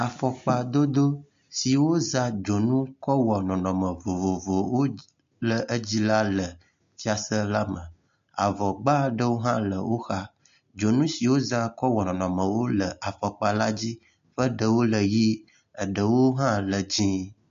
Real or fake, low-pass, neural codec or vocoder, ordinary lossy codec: real; 7.2 kHz; none; MP3, 48 kbps